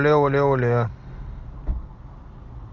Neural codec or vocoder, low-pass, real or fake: none; 7.2 kHz; real